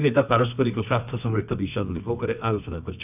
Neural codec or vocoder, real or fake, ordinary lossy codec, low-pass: codec, 24 kHz, 0.9 kbps, WavTokenizer, medium music audio release; fake; none; 3.6 kHz